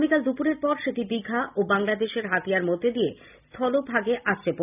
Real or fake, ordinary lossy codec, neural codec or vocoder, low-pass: real; none; none; 3.6 kHz